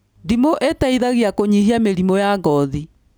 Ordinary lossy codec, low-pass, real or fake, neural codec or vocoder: none; none; real; none